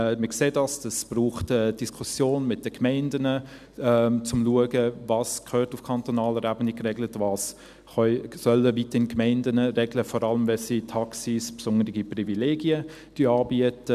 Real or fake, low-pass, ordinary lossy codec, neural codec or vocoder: real; 14.4 kHz; none; none